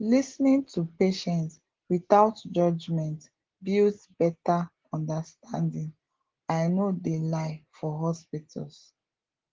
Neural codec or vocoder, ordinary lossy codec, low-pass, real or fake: none; Opus, 16 kbps; 7.2 kHz; real